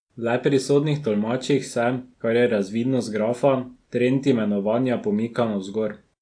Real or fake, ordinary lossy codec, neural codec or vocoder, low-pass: real; AAC, 64 kbps; none; 9.9 kHz